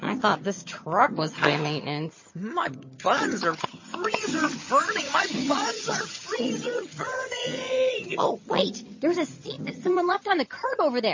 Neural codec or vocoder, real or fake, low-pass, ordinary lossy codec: vocoder, 22.05 kHz, 80 mel bands, HiFi-GAN; fake; 7.2 kHz; MP3, 32 kbps